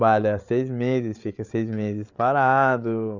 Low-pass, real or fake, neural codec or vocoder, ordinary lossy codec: 7.2 kHz; fake; codec, 16 kHz, 8 kbps, FreqCodec, larger model; none